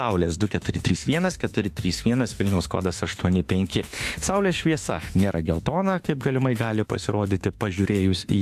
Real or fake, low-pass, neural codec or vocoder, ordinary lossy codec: fake; 14.4 kHz; autoencoder, 48 kHz, 32 numbers a frame, DAC-VAE, trained on Japanese speech; AAC, 96 kbps